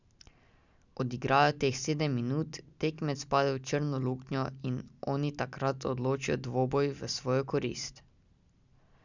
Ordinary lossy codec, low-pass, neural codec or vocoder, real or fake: none; 7.2 kHz; none; real